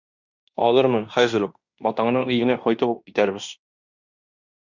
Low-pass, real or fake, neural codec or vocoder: 7.2 kHz; fake; codec, 16 kHz in and 24 kHz out, 0.9 kbps, LongCat-Audio-Codec, fine tuned four codebook decoder